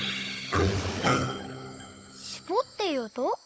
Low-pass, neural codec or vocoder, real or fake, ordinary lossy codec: none; codec, 16 kHz, 16 kbps, FunCodec, trained on Chinese and English, 50 frames a second; fake; none